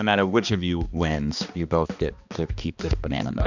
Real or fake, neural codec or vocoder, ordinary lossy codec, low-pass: fake; codec, 16 kHz, 2 kbps, X-Codec, HuBERT features, trained on balanced general audio; Opus, 64 kbps; 7.2 kHz